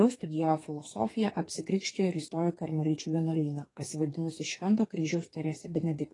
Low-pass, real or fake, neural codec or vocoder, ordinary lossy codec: 10.8 kHz; fake; codec, 32 kHz, 1.9 kbps, SNAC; AAC, 32 kbps